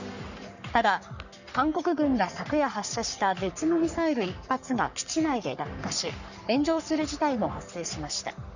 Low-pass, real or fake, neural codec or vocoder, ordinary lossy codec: 7.2 kHz; fake; codec, 44.1 kHz, 3.4 kbps, Pupu-Codec; none